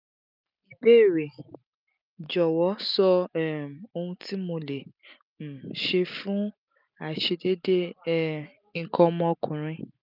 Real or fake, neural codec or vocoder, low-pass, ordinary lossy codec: real; none; 5.4 kHz; none